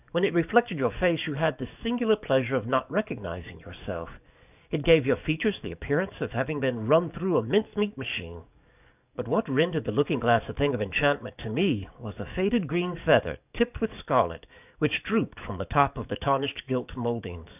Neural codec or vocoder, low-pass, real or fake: codec, 44.1 kHz, 7.8 kbps, DAC; 3.6 kHz; fake